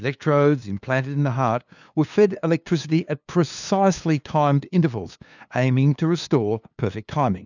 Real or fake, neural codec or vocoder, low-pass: fake; codec, 16 kHz, 2 kbps, FunCodec, trained on LibriTTS, 25 frames a second; 7.2 kHz